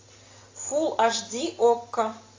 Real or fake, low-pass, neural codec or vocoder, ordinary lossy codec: real; 7.2 kHz; none; AAC, 32 kbps